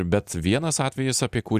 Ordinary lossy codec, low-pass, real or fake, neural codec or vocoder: Opus, 64 kbps; 14.4 kHz; real; none